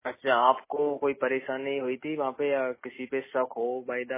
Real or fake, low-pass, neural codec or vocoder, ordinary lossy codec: real; 3.6 kHz; none; MP3, 16 kbps